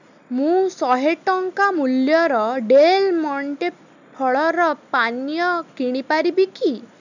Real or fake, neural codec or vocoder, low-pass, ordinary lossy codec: real; none; 7.2 kHz; none